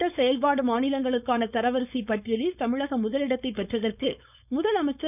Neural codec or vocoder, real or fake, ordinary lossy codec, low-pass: codec, 16 kHz, 4.8 kbps, FACodec; fake; none; 3.6 kHz